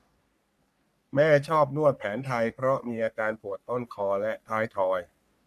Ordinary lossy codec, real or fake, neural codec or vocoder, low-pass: MP3, 96 kbps; fake; codec, 44.1 kHz, 3.4 kbps, Pupu-Codec; 14.4 kHz